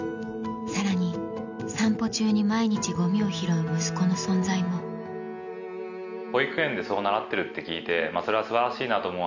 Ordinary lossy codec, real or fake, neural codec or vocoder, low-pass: none; real; none; 7.2 kHz